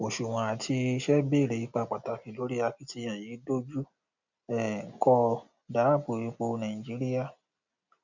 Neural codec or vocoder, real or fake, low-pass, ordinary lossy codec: none; real; 7.2 kHz; none